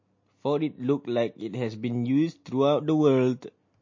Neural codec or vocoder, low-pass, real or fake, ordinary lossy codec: none; 7.2 kHz; real; MP3, 32 kbps